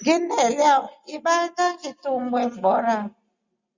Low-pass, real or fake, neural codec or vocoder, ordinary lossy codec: 7.2 kHz; real; none; Opus, 64 kbps